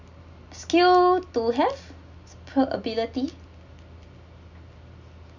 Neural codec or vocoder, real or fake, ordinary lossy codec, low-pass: none; real; none; 7.2 kHz